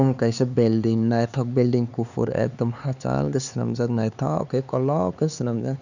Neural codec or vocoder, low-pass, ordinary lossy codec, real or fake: codec, 16 kHz, 4 kbps, X-Codec, HuBERT features, trained on LibriSpeech; 7.2 kHz; none; fake